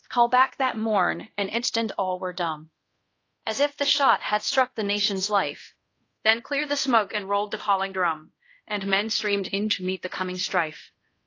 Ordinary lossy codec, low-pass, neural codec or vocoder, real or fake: AAC, 32 kbps; 7.2 kHz; codec, 24 kHz, 0.5 kbps, DualCodec; fake